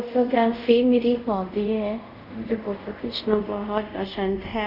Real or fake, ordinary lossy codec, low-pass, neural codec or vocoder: fake; none; 5.4 kHz; codec, 24 kHz, 0.5 kbps, DualCodec